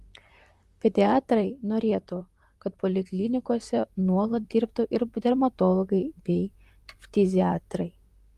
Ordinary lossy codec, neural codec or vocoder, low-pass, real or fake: Opus, 32 kbps; vocoder, 44.1 kHz, 128 mel bands, Pupu-Vocoder; 14.4 kHz; fake